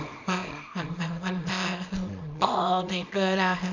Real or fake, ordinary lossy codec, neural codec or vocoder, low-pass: fake; none; codec, 24 kHz, 0.9 kbps, WavTokenizer, small release; 7.2 kHz